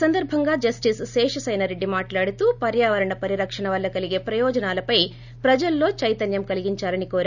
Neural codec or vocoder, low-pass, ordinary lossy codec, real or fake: none; 7.2 kHz; none; real